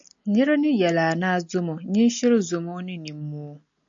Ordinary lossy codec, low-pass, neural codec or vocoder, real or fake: AAC, 64 kbps; 7.2 kHz; none; real